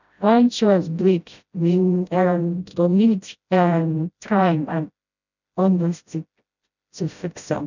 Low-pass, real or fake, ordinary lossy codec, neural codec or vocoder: 7.2 kHz; fake; none; codec, 16 kHz, 0.5 kbps, FreqCodec, smaller model